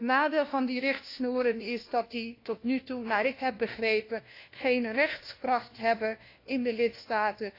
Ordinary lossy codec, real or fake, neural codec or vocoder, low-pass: AAC, 32 kbps; fake; codec, 16 kHz, 1 kbps, FunCodec, trained on LibriTTS, 50 frames a second; 5.4 kHz